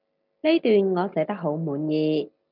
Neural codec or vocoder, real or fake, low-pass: none; real; 5.4 kHz